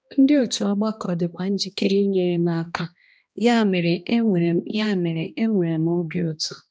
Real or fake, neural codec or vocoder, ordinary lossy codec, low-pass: fake; codec, 16 kHz, 1 kbps, X-Codec, HuBERT features, trained on balanced general audio; none; none